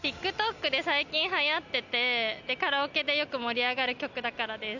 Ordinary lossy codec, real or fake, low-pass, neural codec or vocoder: none; real; 7.2 kHz; none